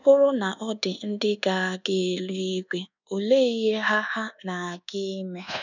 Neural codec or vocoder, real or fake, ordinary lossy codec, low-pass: codec, 24 kHz, 1.2 kbps, DualCodec; fake; none; 7.2 kHz